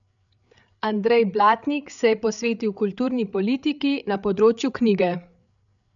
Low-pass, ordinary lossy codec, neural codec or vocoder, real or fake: 7.2 kHz; none; codec, 16 kHz, 8 kbps, FreqCodec, larger model; fake